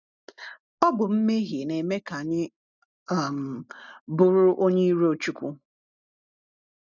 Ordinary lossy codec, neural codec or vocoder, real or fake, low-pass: none; none; real; 7.2 kHz